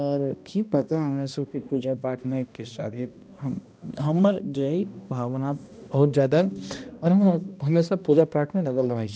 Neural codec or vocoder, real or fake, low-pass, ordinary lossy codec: codec, 16 kHz, 1 kbps, X-Codec, HuBERT features, trained on balanced general audio; fake; none; none